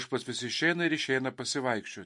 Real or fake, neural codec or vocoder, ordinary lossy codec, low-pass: real; none; MP3, 48 kbps; 10.8 kHz